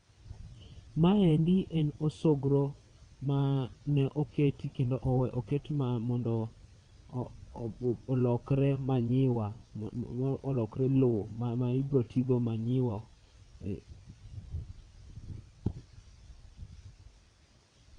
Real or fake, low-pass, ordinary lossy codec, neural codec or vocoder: fake; 9.9 kHz; Opus, 64 kbps; vocoder, 22.05 kHz, 80 mel bands, WaveNeXt